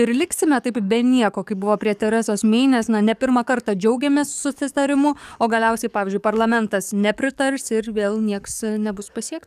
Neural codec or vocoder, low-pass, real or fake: codec, 44.1 kHz, 7.8 kbps, DAC; 14.4 kHz; fake